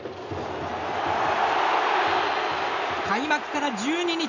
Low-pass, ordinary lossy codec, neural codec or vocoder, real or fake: 7.2 kHz; none; none; real